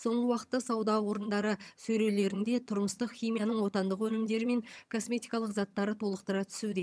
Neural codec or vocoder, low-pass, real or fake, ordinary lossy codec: vocoder, 22.05 kHz, 80 mel bands, HiFi-GAN; none; fake; none